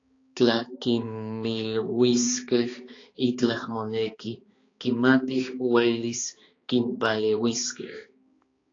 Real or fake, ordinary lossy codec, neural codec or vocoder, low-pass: fake; AAC, 32 kbps; codec, 16 kHz, 2 kbps, X-Codec, HuBERT features, trained on balanced general audio; 7.2 kHz